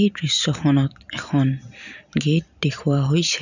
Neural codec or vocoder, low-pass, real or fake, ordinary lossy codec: vocoder, 44.1 kHz, 128 mel bands every 512 samples, BigVGAN v2; 7.2 kHz; fake; none